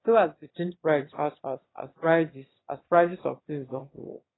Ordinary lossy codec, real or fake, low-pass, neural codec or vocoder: AAC, 16 kbps; fake; 7.2 kHz; autoencoder, 22.05 kHz, a latent of 192 numbers a frame, VITS, trained on one speaker